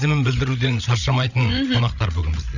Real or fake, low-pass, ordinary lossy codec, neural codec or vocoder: fake; 7.2 kHz; none; codec, 16 kHz, 16 kbps, FreqCodec, larger model